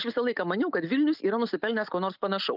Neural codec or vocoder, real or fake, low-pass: none; real; 5.4 kHz